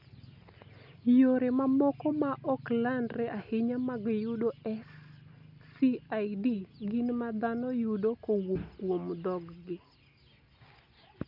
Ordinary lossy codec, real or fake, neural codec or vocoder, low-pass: none; real; none; 5.4 kHz